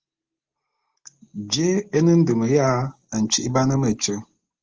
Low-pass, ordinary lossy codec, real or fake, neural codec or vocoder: 7.2 kHz; Opus, 16 kbps; real; none